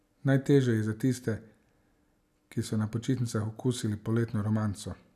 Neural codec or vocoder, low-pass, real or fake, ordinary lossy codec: none; 14.4 kHz; real; none